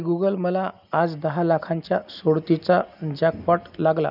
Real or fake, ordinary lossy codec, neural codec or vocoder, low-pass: real; none; none; 5.4 kHz